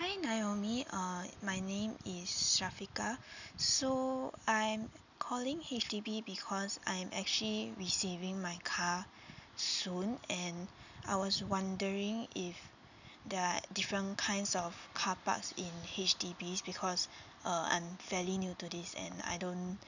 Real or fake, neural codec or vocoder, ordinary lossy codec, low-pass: real; none; none; 7.2 kHz